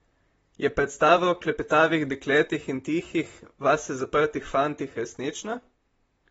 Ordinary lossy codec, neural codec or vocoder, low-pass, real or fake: AAC, 24 kbps; vocoder, 44.1 kHz, 128 mel bands, Pupu-Vocoder; 19.8 kHz; fake